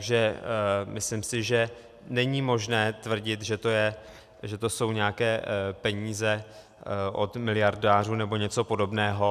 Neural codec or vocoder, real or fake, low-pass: none; real; 14.4 kHz